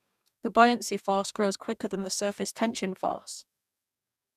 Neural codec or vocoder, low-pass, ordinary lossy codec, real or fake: codec, 44.1 kHz, 2.6 kbps, DAC; 14.4 kHz; none; fake